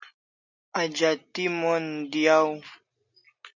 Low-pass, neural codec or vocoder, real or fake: 7.2 kHz; none; real